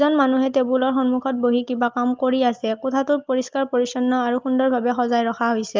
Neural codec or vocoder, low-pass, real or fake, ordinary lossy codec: none; 7.2 kHz; real; Opus, 24 kbps